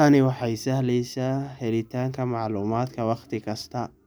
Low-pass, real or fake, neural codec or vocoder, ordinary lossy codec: none; real; none; none